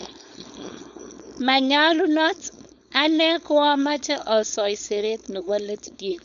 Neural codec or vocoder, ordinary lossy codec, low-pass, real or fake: codec, 16 kHz, 4.8 kbps, FACodec; none; 7.2 kHz; fake